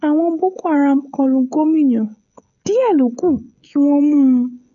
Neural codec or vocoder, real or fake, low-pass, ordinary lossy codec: codec, 16 kHz, 16 kbps, FreqCodec, smaller model; fake; 7.2 kHz; none